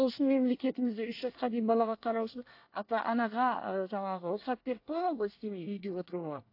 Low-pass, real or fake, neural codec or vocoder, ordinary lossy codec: 5.4 kHz; fake; codec, 24 kHz, 1 kbps, SNAC; AAC, 32 kbps